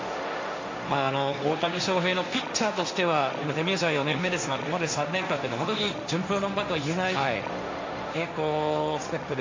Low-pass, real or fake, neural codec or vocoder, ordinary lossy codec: 7.2 kHz; fake; codec, 16 kHz, 1.1 kbps, Voila-Tokenizer; MP3, 64 kbps